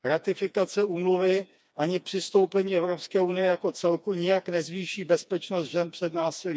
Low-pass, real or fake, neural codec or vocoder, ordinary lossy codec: none; fake; codec, 16 kHz, 2 kbps, FreqCodec, smaller model; none